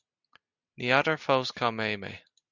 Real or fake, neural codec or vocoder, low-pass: real; none; 7.2 kHz